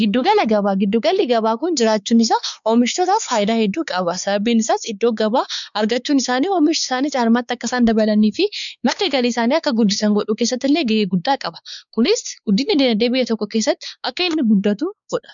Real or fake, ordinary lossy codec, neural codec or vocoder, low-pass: fake; MP3, 96 kbps; codec, 16 kHz, 4 kbps, X-Codec, WavLM features, trained on Multilingual LibriSpeech; 7.2 kHz